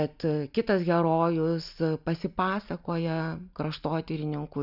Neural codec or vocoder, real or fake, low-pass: none; real; 5.4 kHz